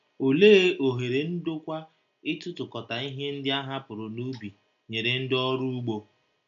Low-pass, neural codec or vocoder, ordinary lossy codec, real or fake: 7.2 kHz; none; none; real